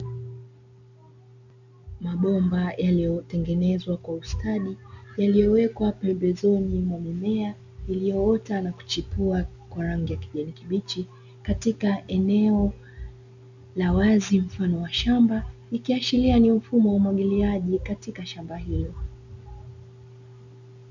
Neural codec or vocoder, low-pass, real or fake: none; 7.2 kHz; real